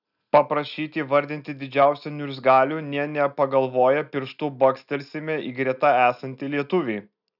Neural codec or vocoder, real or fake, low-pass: none; real; 5.4 kHz